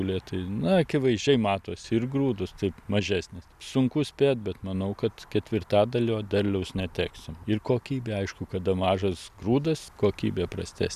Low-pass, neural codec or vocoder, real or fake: 14.4 kHz; none; real